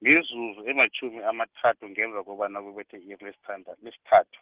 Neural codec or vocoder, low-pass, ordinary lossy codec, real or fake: none; 3.6 kHz; Opus, 16 kbps; real